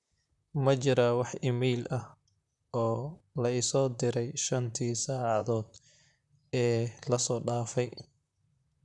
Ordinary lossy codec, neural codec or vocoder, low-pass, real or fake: none; vocoder, 44.1 kHz, 128 mel bands, Pupu-Vocoder; 10.8 kHz; fake